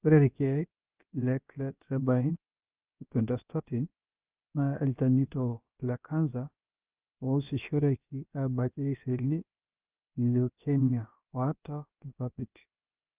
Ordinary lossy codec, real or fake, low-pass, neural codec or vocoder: Opus, 24 kbps; fake; 3.6 kHz; codec, 16 kHz, about 1 kbps, DyCAST, with the encoder's durations